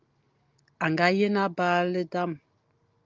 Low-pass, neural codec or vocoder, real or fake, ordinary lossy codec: 7.2 kHz; none; real; Opus, 24 kbps